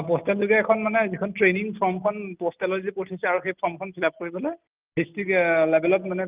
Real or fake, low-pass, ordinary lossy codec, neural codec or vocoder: real; 3.6 kHz; Opus, 32 kbps; none